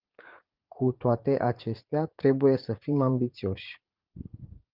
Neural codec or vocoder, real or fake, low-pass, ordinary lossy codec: vocoder, 44.1 kHz, 80 mel bands, Vocos; fake; 5.4 kHz; Opus, 32 kbps